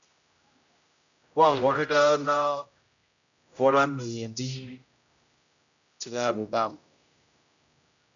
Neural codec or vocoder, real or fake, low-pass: codec, 16 kHz, 0.5 kbps, X-Codec, HuBERT features, trained on general audio; fake; 7.2 kHz